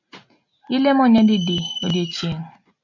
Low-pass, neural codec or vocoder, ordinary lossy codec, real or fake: 7.2 kHz; none; MP3, 64 kbps; real